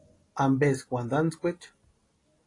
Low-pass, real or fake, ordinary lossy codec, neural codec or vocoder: 10.8 kHz; real; AAC, 32 kbps; none